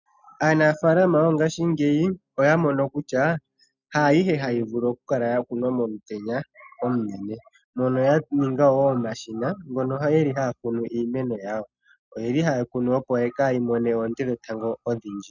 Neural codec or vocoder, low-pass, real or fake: none; 7.2 kHz; real